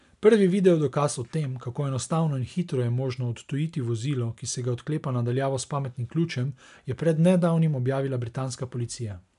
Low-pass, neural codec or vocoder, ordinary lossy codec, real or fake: 10.8 kHz; none; MP3, 96 kbps; real